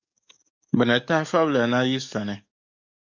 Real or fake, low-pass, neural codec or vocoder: fake; 7.2 kHz; codec, 44.1 kHz, 7.8 kbps, DAC